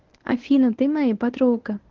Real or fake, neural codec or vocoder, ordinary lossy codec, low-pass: fake; codec, 24 kHz, 0.9 kbps, WavTokenizer, medium speech release version 1; Opus, 16 kbps; 7.2 kHz